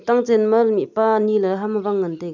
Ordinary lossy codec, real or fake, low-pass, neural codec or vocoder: none; real; 7.2 kHz; none